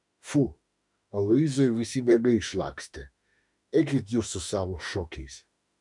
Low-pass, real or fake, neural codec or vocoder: 10.8 kHz; fake; autoencoder, 48 kHz, 32 numbers a frame, DAC-VAE, trained on Japanese speech